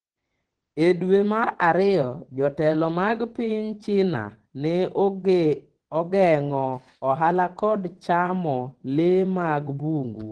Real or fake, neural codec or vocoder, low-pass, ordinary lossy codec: fake; vocoder, 22.05 kHz, 80 mel bands, WaveNeXt; 9.9 kHz; Opus, 16 kbps